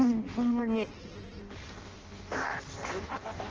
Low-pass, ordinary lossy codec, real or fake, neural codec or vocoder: 7.2 kHz; Opus, 16 kbps; fake; codec, 16 kHz in and 24 kHz out, 0.6 kbps, FireRedTTS-2 codec